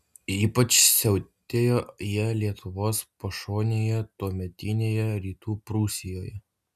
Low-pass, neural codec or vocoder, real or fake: 14.4 kHz; none; real